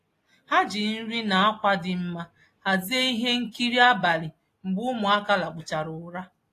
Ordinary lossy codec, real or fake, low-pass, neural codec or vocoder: AAC, 48 kbps; real; 14.4 kHz; none